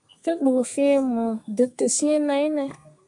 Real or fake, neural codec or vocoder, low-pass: fake; codec, 32 kHz, 1.9 kbps, SNAC; 10.8 kHz